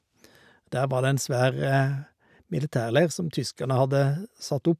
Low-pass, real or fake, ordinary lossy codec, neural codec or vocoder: 14.4 kHz; real; none; none